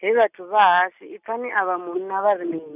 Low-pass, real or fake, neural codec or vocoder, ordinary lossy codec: 3.6 kHz; real; none; none